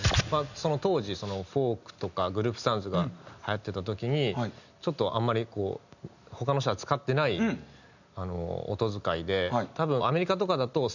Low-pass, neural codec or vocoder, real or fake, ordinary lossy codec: 7.2 kHz; none; real; none